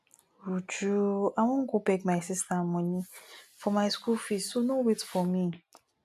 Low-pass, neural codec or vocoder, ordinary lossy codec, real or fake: 14.4 kHz; none; AAC, 96 kbps; real